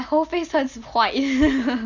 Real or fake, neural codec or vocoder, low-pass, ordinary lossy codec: real; none; 7.2 kHz; none